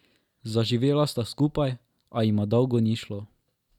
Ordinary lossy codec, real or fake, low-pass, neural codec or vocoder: none; real; 19.8 kHz; none